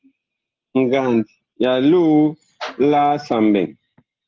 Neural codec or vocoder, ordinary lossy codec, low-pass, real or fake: none; Opus, 16 kbps; 7.2 kHz; real